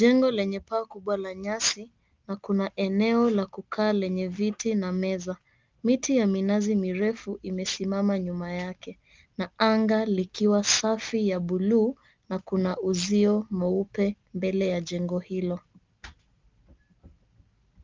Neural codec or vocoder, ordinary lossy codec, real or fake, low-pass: none; Opus, 24 kbps; real; 7.2 kHz